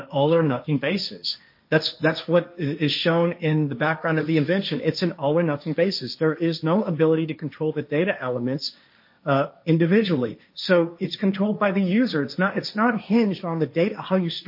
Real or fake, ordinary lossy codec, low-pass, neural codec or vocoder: fake; MP3, 32 kbps; 5.4 kHz; codec, 16 kHz, 1.1 kbps, Voila-Tokenizer